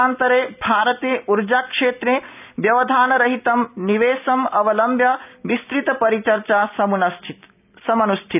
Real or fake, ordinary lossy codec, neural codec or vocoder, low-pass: real; none; none; 3.6 kHz